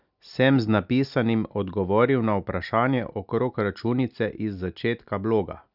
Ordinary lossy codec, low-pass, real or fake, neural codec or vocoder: none; 5.4 kHz; real; none